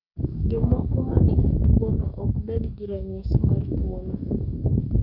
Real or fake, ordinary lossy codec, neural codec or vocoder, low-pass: fake; none; codec, 44.1 kHz, 2.6 kbps, DAC; 5.4 kHz